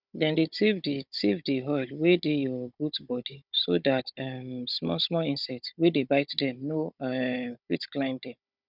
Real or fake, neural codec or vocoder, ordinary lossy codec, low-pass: fake; codec, 16 kHz, 16 kbps, FunCodec, trained on Chinese and English, 50 frames a second; none; 5.4 kHz